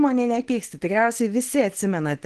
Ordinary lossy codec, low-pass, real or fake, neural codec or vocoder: Opus, 16 kbps; 10.8 kHz; fake; codec, 24 kHz, 0.9 kbps, WavTokenizer, small release